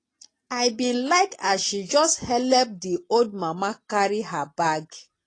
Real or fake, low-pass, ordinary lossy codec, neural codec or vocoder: real; 9.9 kHz; AAC, 32 kbps; none